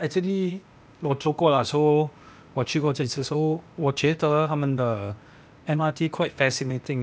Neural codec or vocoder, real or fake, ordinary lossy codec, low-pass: codec, 16 kHz, 0.8 kbps, ZipCodec; fake; none; none